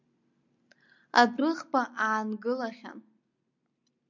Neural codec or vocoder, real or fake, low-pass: none; real; 7.2 kHz